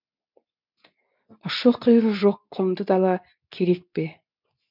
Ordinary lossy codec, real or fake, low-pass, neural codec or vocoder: none; fake; 5.4 kHz; codec, 24 kHz, 0.9 kbps, WavTokenizer, medium speech release version 2